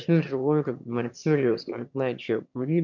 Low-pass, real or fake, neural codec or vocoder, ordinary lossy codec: 7.2 kHz; fake; autoencoder, 22.05 kHz, a latent of 192 numbers a frame, VITS, trained on one speaker; MP3, 64 kbps